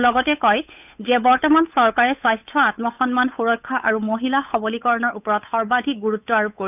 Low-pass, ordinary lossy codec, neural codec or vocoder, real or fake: 3.6 kHz; none; codec, 16 kHz, 8 kbps, FunCodec, trained on Chinese and English, 25 frames a second; fake